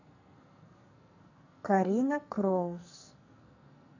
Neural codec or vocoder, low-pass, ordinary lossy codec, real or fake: codec, 44.1 kHz, 2.6 kbps, SNAC; 7.2 kHz; none; fake